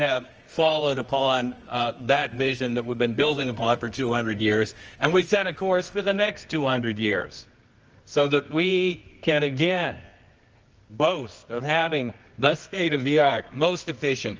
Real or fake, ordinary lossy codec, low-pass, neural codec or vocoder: fake; Opus, 24 kbps; 7.2 kHz; codec, 24 kHz, 0.9 kbps, WavTokenizer, medium music audio release